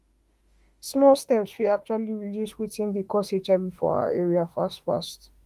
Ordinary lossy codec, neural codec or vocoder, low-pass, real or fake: Opus, 24 kbps; autoencoder, 48 kHz, 32 numbers a frame, DAC-VAE, trained on Japanese speech; 14.4 kHz; fake